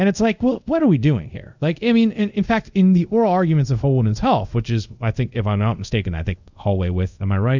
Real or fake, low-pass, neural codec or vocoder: fake; 7.2 kHz; codec, 24 kHz, 0.5 kbps, DualCodec